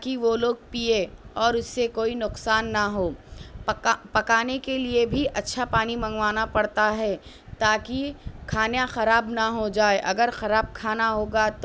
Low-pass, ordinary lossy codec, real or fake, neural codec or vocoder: none; none; real; none